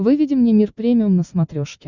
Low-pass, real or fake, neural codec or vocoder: 7.2 kHz; real; none